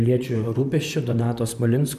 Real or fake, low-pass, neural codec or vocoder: fake; 14.4 kHz; vocoder, 44.1 kHz, 128 mel bands, Pupu-Vocoder